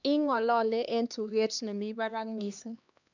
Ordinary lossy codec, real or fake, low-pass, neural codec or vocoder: none; fake; 7.2 kHz; codec, 16 kHz, 2 kbps, X-Codec, HuBERT features, trained on LibriSpeech